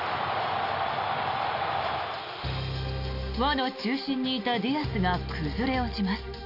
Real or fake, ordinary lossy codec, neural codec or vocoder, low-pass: real; AAC, 48 kbps; none; 5.4 kHz